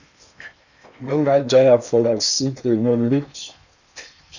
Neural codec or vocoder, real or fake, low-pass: codec, 16 kHz in and 24 kHz out, 0.8 kbps, FocalCodec, streaming, 65536 codes; fake; 7.2 kHz